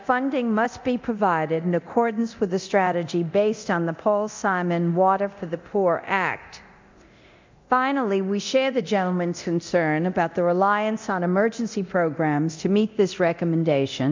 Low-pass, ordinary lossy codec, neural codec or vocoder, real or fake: 7.2 kHz; MP3, 64 kbps; codec, 24 kHz, 0.9 kbps, DualCodec; fake